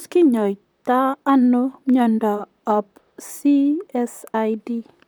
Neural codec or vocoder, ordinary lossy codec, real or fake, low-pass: vocoder, 44.1 kHz, 128 mel bands, Pupu-Vocoder; none; fake; none